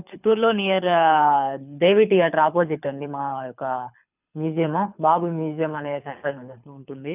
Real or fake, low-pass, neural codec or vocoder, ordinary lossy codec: fake; 3.6 kHz; codec, 24 kHz, 6 kbps, HILCodec; none